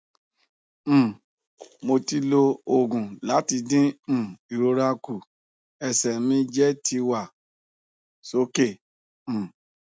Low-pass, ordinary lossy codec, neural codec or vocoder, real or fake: none; none; none; real